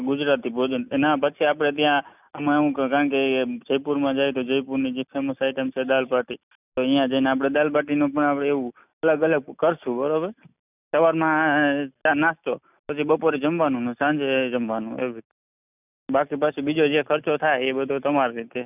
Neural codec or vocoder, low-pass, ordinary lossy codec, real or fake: none; 3.6 kHz; none; real